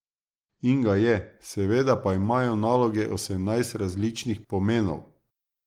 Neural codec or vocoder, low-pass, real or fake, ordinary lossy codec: none; 19.8 kHz; real; Opus, 24 kbps